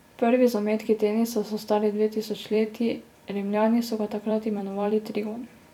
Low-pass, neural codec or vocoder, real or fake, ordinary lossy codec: 19.8 kHz; vocoder, 44.1 kHz, 128 mel bands every 256 samples, BigVGAN v2; fake; none